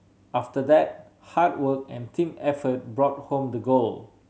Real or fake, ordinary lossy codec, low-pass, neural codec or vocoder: real; none; none; none